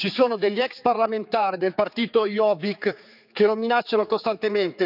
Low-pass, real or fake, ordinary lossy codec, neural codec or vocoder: 5.4 kHz; fake; none; codec, 16 kHz, 4 kbps, X-Codec, HuBERT features, trained on general audio